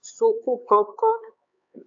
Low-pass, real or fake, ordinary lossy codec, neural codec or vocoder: 7.2 kHz; fake; AAC, 64 kbps; codec, 16 kHz, 4 kbps, X-Codec, HuBERT features, trained on LibriSpeech